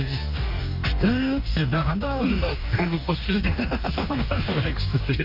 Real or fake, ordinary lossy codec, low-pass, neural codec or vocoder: fake; none; 5.4 kHz; codec, 44.1 kHz, 2.6 kbps, DAC